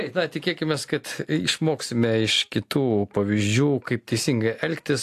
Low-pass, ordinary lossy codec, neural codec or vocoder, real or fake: 14.4 kHz; AAC, 64 kbps; none; real